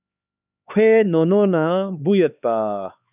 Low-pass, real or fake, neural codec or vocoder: 3.6 kHz; fake; codec, 16 kHz, 4 kbps, X-Codec, HuBERT features, trained on LibriSpeech